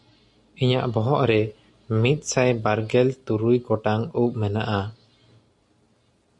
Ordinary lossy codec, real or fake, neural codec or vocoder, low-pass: MP3, 48 kbps; real; none; 10.8 kHz